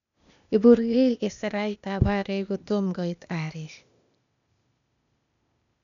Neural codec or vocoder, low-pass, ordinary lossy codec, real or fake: codec, 16 kHz, 0.8 kbps, ZipCodec; 7.2 kHz; none; fake